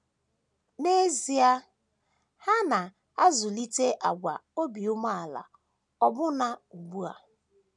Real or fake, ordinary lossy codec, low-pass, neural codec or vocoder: real; none; 10.8 kHz; none